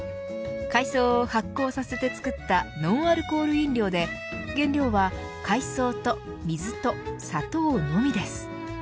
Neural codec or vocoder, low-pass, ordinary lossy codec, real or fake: none; none; none; real